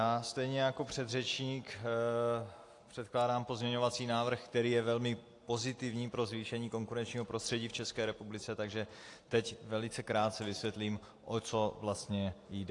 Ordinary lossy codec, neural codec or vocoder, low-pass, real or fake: AAC, 48 kbps; none; 10.8 kHz; real